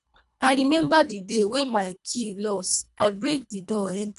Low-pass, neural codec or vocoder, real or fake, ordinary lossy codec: 10.8 kHz; codec, 24 kHz, 1.5 kbps, HILCodec; fake; none